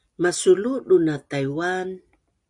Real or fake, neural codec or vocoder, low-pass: real; none; 10.8 kHz